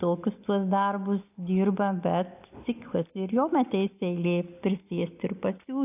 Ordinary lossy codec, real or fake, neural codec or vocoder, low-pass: AAC, 32 kbps; real; none; 3.6 kHz